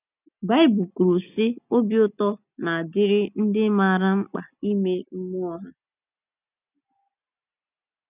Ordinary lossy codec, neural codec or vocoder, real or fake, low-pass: none; none; real; 3.6 kHz